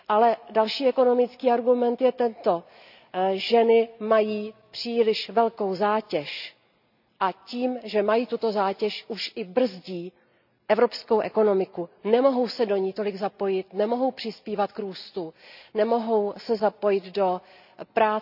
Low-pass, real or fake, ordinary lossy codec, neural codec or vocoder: 5.4 kHz; real; none; none